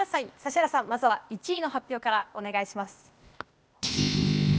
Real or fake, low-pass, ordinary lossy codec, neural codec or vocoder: fake; none; none; codec, 16 kHz, 0.8 kbps, ZipCodec